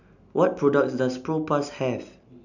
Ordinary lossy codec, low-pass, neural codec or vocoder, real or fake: none; 7.2 kHz; none; real